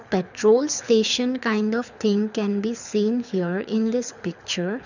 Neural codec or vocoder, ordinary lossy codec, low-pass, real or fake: codec, 24 kHz, 6 kbps, HILCodec; none; 7.2 kHz; fake